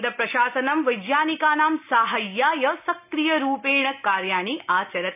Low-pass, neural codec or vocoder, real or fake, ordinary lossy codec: 3.6 kHz; none; real; none